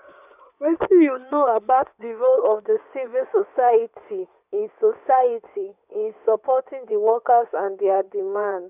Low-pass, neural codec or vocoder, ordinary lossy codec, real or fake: 3.6 kHz; codec, 16 kHz in and 24 kHz out, 2.2 kbps, FireRedTTS-2 codec; none; fake